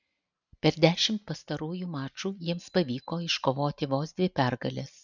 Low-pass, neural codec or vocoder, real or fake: 7.2 kHz; none; real